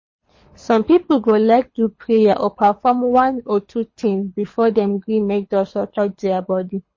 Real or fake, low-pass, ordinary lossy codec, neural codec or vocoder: fake; 7.2 kHz; MP3, 32 kbps; codec, 24 kHz, 6 kbps, HILCodec